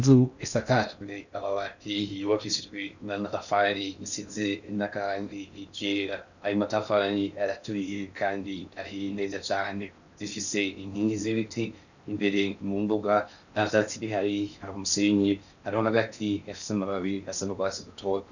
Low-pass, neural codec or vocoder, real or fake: 7.2 kHz; codec, 16 kHz in and 24 kHz out, 0.6 kbps, FocalCodec, streaming, 4096 codes; fake